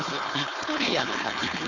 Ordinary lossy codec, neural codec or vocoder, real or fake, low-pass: none; codec, 16 kHz, 4.8 kbps, FACodec; fake; 7.2 kHz